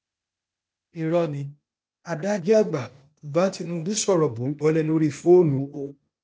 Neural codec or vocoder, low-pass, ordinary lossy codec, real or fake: codec, 16 kHz, 0.8 kbps, ZipCodec; none; none; fake